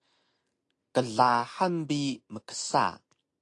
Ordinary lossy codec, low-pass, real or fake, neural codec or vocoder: AAC, 48 kbps; 10.8 kHz; real; none